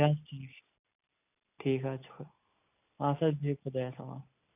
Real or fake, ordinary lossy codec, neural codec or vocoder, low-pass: real; none; none; 3.6 kHz